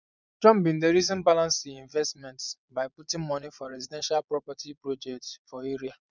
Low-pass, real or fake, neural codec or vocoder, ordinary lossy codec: 7.2 kHz; fake; vocoder, 22.05 kHz, 80 mel bands, Vocos; none